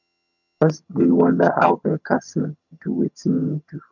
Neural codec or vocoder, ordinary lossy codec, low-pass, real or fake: vocoder, 22.05 kHz, 80 mel bands, HiFi-GAN; none; 7.2 kHz; fake